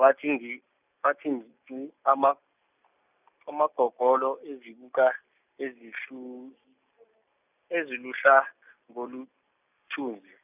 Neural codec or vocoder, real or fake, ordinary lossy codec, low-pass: none; real; none; 3.6 kHz